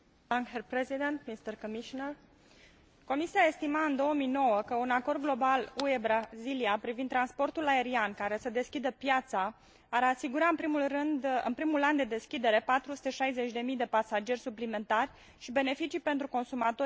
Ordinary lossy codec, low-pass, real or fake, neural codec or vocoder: none; none; real; none